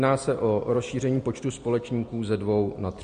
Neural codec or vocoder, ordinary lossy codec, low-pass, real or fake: none; MP3, 48 kbps; 9.9 kHz; real